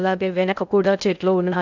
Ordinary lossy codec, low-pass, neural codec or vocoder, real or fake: none; 7.2 kHz; codec, 16 kHz in and 24 kHz out, 0.6 kbps, FocalCodec, streaming, 2048 codes; fake